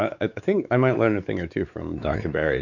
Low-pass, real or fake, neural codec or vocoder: 7.2 kHz; fake; codec, 16 kHz, 4 kbps, X-Codec, WavLM features, trained on Multilingual LibriSpeech